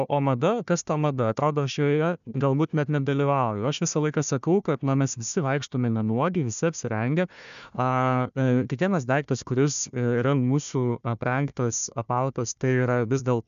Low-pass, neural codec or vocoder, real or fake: 7.2 kHz; codec, 16 kHz, 1 kbps, FunCodec, trained on Chinese and English, 50 frames a second; fake